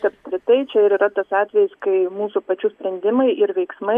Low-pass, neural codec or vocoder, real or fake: 14.4 kHz; none; real